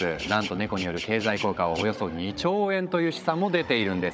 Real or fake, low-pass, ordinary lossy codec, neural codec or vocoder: fake; none; none; codec, 16 kHz, 16 kbps, FunCodec, trained on Chinese and English, 50 frames a second